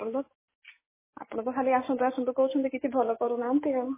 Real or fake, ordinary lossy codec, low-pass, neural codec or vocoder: real; MP3, 16 kbps; 3.6 kHz; none